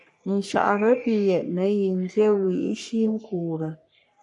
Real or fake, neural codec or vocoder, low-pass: fake; codec, 44.1 kHz, 3.4 kbps, Pupu-Codec; 10.8 kHz